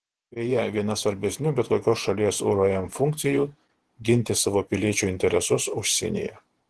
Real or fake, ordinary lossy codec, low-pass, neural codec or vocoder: fake; Opus, 16 kbps; 10.8 kHz; vocoder, 48 kHz, 128 mel bands, Vocos